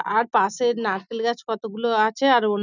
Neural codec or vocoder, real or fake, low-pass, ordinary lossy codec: none; real; 7.2 kHz; none